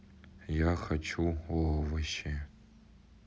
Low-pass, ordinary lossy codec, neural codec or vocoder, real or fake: none; none; none; real